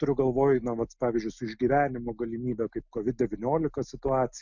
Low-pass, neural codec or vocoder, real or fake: 7.2 kHz; none; real